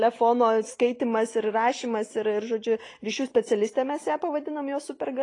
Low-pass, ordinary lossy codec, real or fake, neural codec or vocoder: 10.8 kHz; AAC, 32 kbps; real; none